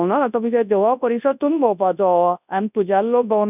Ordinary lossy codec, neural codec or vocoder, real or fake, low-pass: none; codec, 24 kHz, 0.9 kbps, WavTokenizer, large speech release; fake; 3.6 kHz